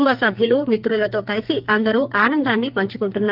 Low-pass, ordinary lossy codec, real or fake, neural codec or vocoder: 5.4 kHz; Opus, 24 kbps; fake; codec, 44.1 kHz, 2.6 kbps, SNAC